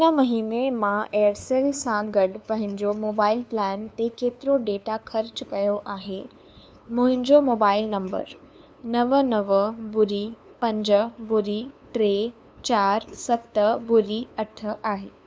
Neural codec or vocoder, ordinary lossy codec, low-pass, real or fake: codec, 16 kHz, 2 kbps, FunCodec, trained on LibriTTS, 25 frames a second; none; none; fake